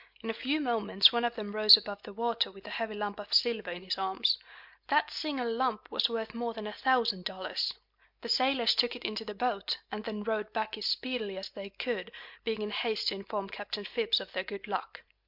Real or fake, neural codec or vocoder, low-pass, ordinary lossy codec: real; none; 5.4 kHz; MP3, 48 kbps